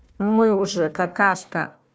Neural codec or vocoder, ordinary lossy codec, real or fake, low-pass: codec, 16 kHz, 1 kbps, FunCodec, trained on Chinese and English, 50 frames a second; none; fake; none